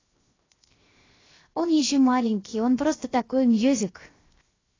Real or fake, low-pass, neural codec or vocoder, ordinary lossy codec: fake; 7.2 kHz; codec, 16 kHz, 0.7 kbps, FocalCodec; AAC, 32 kbps